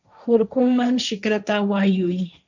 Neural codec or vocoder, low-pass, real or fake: codec, 16 kHz, 1.1 kbps, Voila-Tokenizer; 7.2 kHz; fake